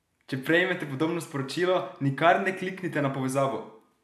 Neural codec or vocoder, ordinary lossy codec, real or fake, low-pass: none; AAC, 96 kbps; real; 14.4 kHz